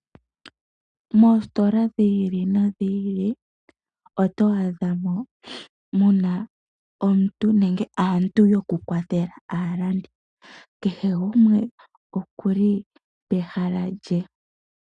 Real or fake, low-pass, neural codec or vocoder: real; 9.9 kHz; none